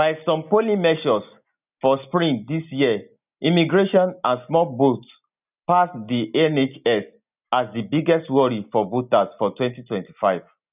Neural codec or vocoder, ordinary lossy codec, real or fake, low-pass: none; none; real; 3.6 kHz